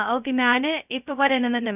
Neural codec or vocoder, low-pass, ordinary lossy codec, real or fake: codec, 16 kHz, 0.2 kbps, FocalCodec; 3.6 kHz; none; fake